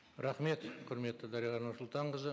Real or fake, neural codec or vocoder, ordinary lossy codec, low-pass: real; none; none; none